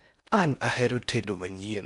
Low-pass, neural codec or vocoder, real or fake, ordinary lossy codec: 10.8 kHz; codec, 16 kHz in and 24 kHz out, 0.6 kbps, FocalCodec, streaming, 4096 codes; fake; none